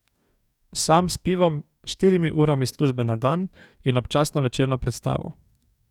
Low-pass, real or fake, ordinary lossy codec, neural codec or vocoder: 19.8 kHz; fake; none; codec, 44.1 kHz, 2.6 kbps, DAC